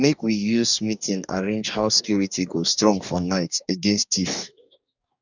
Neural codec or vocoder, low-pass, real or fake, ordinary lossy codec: codec, 44.1 kHz, 2.6 kbps, DAC; 7.2 kHz; fake; none